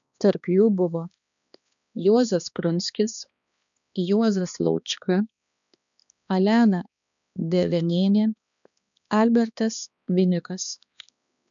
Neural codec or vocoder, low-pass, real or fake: codec, 16 kHz, 2 kbps, X-Codec, HuBERT features, trained on balanced general audio; 7.2 kHz; fake